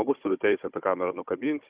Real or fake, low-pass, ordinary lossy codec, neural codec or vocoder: fake; 3.6 kHz; Opus, 64 kbps; codec, 16 kHz, 16 kbps, FunCodec, trained on Chinese and English, 50 frames a second